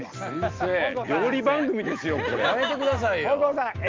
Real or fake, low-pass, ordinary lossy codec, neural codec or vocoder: real; 7.2 kHz; Opus, 24 kbps; none